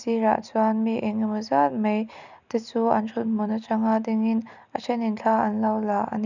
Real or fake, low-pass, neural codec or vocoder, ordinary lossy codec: real; 7.2 kHz; none; none